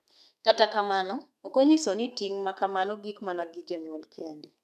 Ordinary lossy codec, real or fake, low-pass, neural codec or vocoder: none; fake; 14.4 kHz; codec, 32 kHz, 1.9 kbps, SNAC